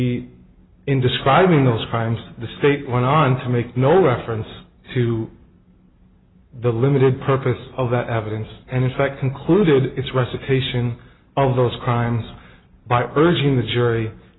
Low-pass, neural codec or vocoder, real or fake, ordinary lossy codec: 7.2 kHz; none; real; AAC, 16 kbps